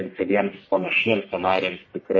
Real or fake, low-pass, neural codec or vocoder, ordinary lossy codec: fake; 7.2 kHz; codec, 44.1 kHz, 1.7 kbps, Pupu-Codec; MP3, 32 kbps